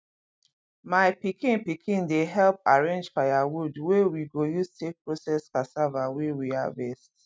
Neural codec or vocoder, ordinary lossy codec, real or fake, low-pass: none; none; real; none